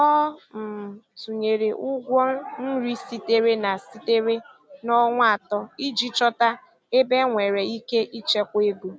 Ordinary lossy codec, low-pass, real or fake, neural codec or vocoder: none; none; real; none